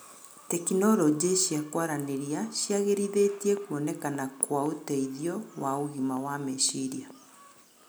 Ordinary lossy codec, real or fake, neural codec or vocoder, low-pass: none; real; none; none